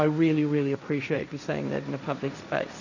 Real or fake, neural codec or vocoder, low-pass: fake; codec, 16 kHz, 1.1 kbps, Voila-Tokenizer; 7.2 kHz